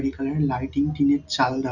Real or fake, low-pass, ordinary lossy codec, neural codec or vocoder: real; 7.2 kHz; none; none